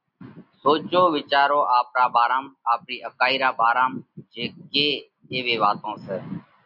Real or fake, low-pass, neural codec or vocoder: real; 5.4 kHz; none